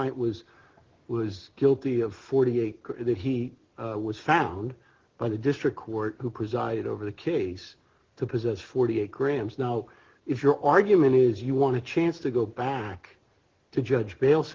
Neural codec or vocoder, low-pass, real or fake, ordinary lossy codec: none; 7.2 kHz; real; Opus, 16 kbps